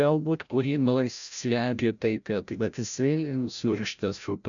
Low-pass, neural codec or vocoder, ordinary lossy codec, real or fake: 7.2 kHz; codec, 16 kHz, 0.5 kbps, FreqCodec, larger model; MP3, 96 kbps; fake